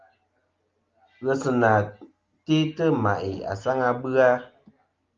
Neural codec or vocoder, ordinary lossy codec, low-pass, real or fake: none; Opus, 32 kbps; 7.2 kHz; real